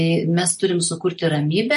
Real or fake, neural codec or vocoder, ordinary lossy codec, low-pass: real; none; MP3, 48 kbps; 14.4 kHz